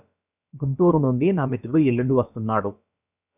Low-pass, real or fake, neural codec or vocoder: 3.6 kHz; fake; codec, 16 kHz, about 1 kbps, DyCAST, with the encoder's durations